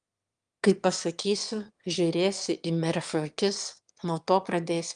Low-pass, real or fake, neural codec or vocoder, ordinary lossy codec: 9.9 kHz; fake; autoencoder, 22.05 kHz, a latent of 192 numbers a frame, VITS, trained on one speaker; Opus, 32 kbps